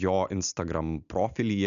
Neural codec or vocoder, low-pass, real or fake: none; 7.2 kHz; real